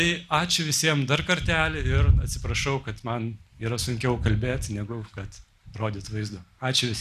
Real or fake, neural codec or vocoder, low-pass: real; none; 14.4 kHz